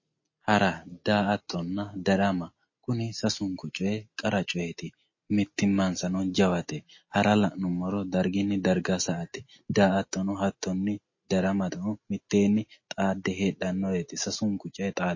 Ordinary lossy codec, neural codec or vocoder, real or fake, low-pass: MP3, 32 kbps; none; real; 7.2 kHz